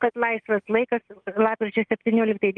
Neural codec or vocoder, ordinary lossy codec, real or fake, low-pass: none; Opus, 24 kbps; real; 9.9 kHz